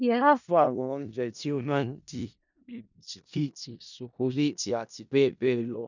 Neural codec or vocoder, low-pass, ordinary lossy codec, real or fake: codec, 16 kHz in and 24 kHz out, 0.4 kbps, LongCat-Audio-Codec, four codebook decoder; 7.2 kHz; none; fake